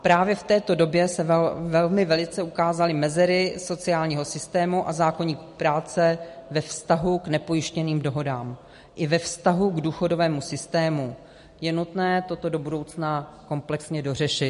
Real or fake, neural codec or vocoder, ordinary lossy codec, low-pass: real; none; MP3, 48 kbps; 10.8 kHz